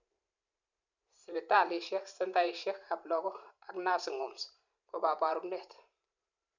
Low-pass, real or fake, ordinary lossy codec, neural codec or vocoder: 7.2 kHz; fake; none; vocoder, 44.1 kHz, 128 mel bands every 512 samples, BigVGAN v2